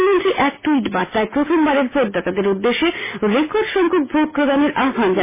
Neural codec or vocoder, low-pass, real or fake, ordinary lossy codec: none; 3.6 kHz; real; MP3, 16 kbps